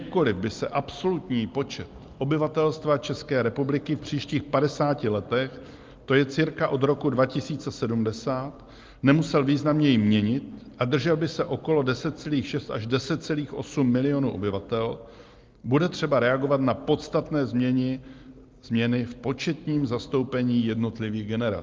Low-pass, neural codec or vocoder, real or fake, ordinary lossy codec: 7.2 kHz; none; real; Opus, 32 kbps